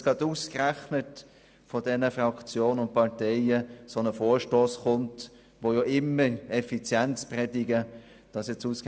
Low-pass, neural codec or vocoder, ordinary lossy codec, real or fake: none; none; none; real